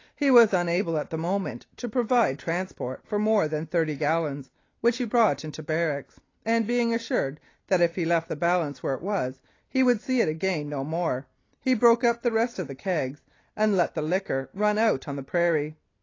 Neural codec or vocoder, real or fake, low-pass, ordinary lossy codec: none; real; 7.2 kHz; AAC, 32 kbps